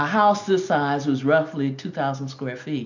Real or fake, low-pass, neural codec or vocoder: real; 7.2 kHz; none